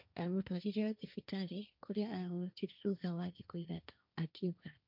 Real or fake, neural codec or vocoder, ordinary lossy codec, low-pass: fake; codec, 16 kHz, 1.1 kbps, Voila-Tokenizer; none; 5.4 kHz